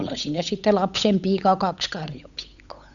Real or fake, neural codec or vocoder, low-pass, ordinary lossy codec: fake; codec, 16 kHz, 8 kbps, FunCodec, trained on Chinese and English, 25 frames a second; 7.2 kHz; none